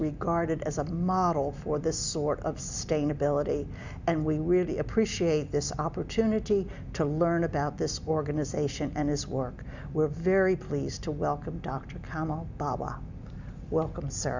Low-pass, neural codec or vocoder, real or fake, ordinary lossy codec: 7.2 kHz; none; real; Opus, 64 kbps